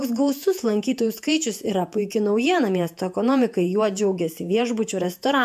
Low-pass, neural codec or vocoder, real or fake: 14.4 kHz; vocoder, 48 kHz, 128 mel bands, Vocos; fake